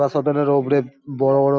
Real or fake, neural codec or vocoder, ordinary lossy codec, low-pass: real; none; none; none